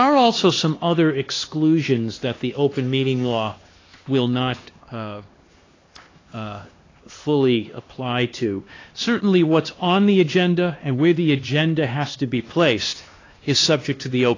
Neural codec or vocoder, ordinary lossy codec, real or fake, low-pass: codec, 16 kHz, 2 kbps, X-Codec, HuBERT features, trained on LibriSpeech; AAC, 32 kbps; fake; 7.2 kHz